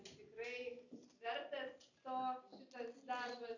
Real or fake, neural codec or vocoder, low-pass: real; none; 7.2 kHz